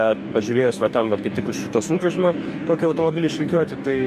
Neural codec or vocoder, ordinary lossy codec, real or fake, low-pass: codec, 44.1 kHz, 2.6 kbps, DAC; MP3, 64 kbps; fake; 14.4 kHz